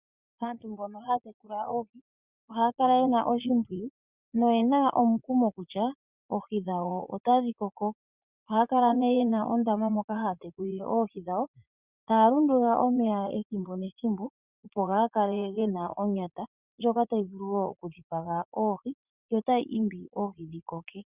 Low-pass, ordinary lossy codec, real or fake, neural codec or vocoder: 3.6 kHz; Opus, 64 kbps; fake; vocoder, 44.1 kHz, 80 mel bands, Vocos